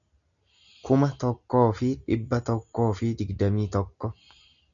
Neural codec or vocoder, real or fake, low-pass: none; real; 7.2 kHz